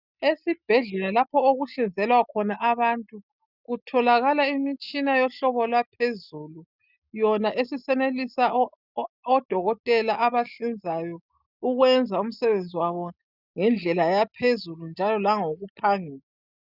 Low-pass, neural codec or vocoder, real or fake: 5.4 kHz; none; real